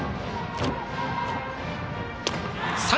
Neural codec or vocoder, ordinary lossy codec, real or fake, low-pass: none; none; real; none